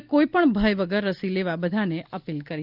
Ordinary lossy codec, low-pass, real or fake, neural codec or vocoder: Opus, 32 kbps; 5.4 kHz; real; none